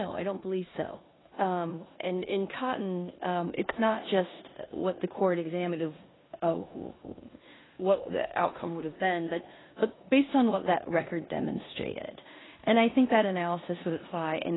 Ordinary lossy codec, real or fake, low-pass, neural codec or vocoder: AAC, 16 kbps; fake; 7.2 kHz; codec, 16 kHz in and 24 kHz out, 0.9 kbps, LongCat-Audio-Codec, four codebook decoder